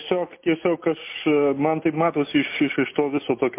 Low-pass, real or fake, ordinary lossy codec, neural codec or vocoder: 3.6 kHz; real; MP3, 24 kbps; none